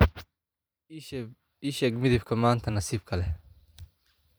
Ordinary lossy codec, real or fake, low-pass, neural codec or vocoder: none; fake; none; vocoder, 44.1 kHz, 128 mel bands every 512 samples, BigVGAN v2